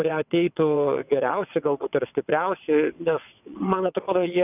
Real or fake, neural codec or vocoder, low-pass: fake; vocoder, 22.05 kHz, 80 mel bands, WaveNeXt; 3.6 kHz